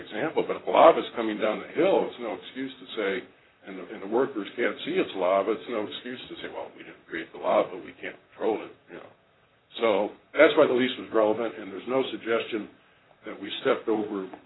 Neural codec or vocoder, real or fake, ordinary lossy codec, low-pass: vocoder, 44.1 kHz, 128 mel bands, Pupu-Vocoder; fake; AAC, 16 kbps; 7.2 kHz